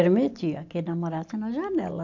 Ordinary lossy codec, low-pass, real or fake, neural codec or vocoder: AAC, 48 kbps; 7.2 kHz; real; none